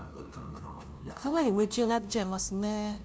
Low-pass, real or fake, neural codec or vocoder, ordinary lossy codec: none; fake; codec, 16 kHz, 0.5 kbps, FunCodec, trained on LibriTTS, 25 frames a second; none